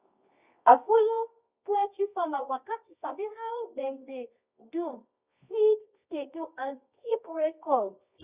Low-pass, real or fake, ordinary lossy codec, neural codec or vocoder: 3.6 kHz; fake; Opus, 64 kbps; codec, 24 kHz, 0.9 kbps, WavTokenizer, medium music audio release